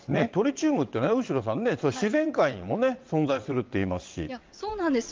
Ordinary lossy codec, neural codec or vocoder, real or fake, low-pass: Opus, 32 kbps; vocoder, 22.05 kHz, 80 mel bands, WaveNeXt; fake; 7.2 kHz